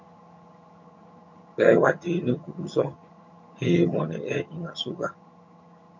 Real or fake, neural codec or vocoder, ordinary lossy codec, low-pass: fake; vocoder, 22.05 kHz, 80 mel bands, HiFi-GAN; MP3, 48 kbps; 7.2 kHz